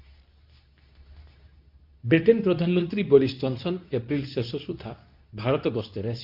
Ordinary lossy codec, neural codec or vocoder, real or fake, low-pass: AAC, 48 kbps; codec, 24 kHz, 0.9 kbps, WavTokenizer, medium speech release version 2; fake; 5.4 kHz